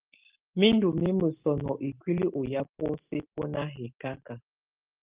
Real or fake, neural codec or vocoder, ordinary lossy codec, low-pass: real; none; Opus, 32 kbps; 3.6 kHz